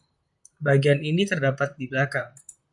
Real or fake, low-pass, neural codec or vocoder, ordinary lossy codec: fake; 10.8 kHz; vocoder, 44.1 kHz, 128 mel bands, Pupu-Vocoder; MP3, 96 kbps